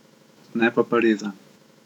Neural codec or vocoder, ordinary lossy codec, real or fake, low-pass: autoencoder, 48 kHz, 128 numbers a frame, DAC-VAE, trained on Japanese speech; none; fake; 19.8 kHz